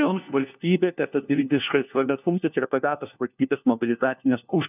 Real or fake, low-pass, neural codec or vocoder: fake; 3.6 kHz; codec, 16 kHz, 1 kbps, FunCodec, trained on LibriTTS, 50 frames a second